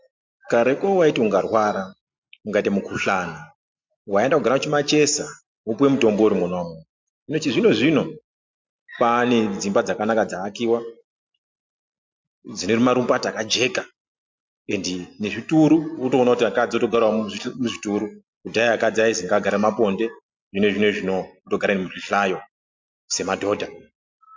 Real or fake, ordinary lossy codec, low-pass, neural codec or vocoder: real; MP3, 64 kbps; 7.2 kHz; none